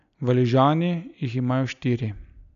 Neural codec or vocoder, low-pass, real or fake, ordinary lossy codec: none; 7.2 kHz; real; none